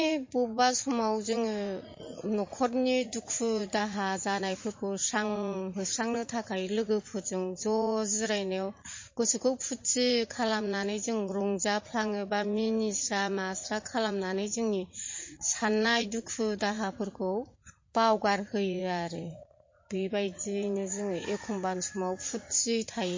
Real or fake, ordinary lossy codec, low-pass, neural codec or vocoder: fake; MP3, 32 kbps; 7.2 kHz; vocoder, 44.1 kHz, 80 mel bands, Vocos